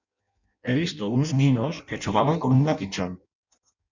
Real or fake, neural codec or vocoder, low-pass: fake; codec, 16 kHz in and 24 kHz out, 0.6 kbps, FireRedTTS-2 codec; 7.2 kHz